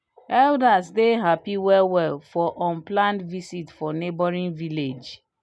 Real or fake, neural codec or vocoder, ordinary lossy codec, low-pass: real; none; none; none